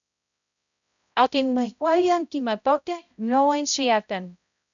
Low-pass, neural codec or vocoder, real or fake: 7.2 kHz; codec, 16 kHz, 0.5 kbps, X-Codec, HuBERT features, trained on balanced general audio; fake